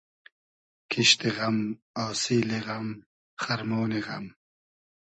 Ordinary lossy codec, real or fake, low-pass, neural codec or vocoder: MP3, 32 kbps; real; 10.8 kHz; none